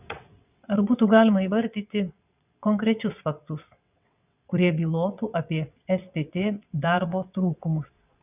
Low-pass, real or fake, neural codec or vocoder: 3.6 kHz; fake; vocoder, 22.05 kHz, 80 mel bands, WaveNeXt